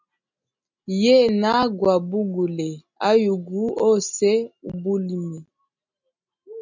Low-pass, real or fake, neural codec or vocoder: 7.2 kHz; real; none